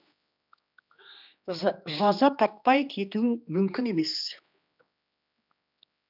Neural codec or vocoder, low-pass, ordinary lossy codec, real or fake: codec, 16 kHz, 2 kbps, X-Codec, HuBERT features, trained on general audio; 5.4 kHz; none; fake